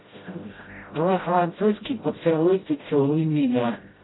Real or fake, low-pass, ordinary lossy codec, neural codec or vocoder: fake; 7.2 kHz; AAC, 16 kbps; codec, 16 kHz, 0.5 kbps, FreqCodec, smaller model